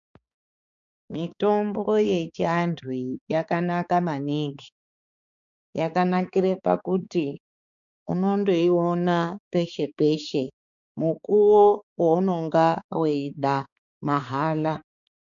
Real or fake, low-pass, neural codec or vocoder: fake; 7.2 kHz; codec, 16 kHz, 4 kbps, X-Codec, HuBERT features, trained on balanced general audio